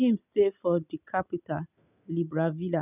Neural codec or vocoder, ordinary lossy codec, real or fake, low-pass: none; none; real; 3.6 kHz